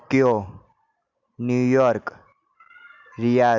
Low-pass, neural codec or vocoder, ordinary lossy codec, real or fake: 7.2 kHz; none; Opus, 64 kbps; real